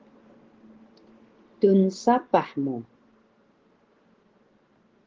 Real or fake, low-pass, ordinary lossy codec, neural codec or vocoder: real; 7.2 kHz; Opus, 16 kbps; none